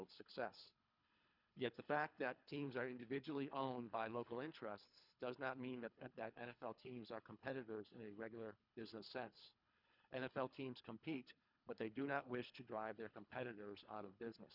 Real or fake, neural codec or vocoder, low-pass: fake; codec, 24 kHz, 3 kbps, HILCodec; 5.4 kHz